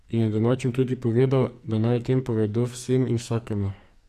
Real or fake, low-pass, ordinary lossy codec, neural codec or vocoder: fake; 14.4 kHz; none; codec, 44.1 kHz, 2.6 kbps, SNAC